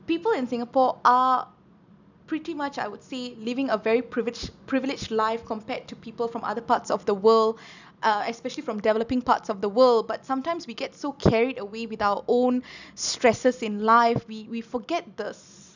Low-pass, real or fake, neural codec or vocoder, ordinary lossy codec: 7.2 kHz; real; none; none